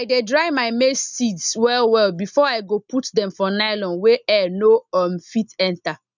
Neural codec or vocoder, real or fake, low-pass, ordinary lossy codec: none; real; 7.2 kHz; none